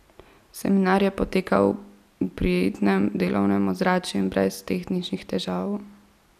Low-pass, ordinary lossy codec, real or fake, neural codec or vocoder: 14.4 kHz; none; real; none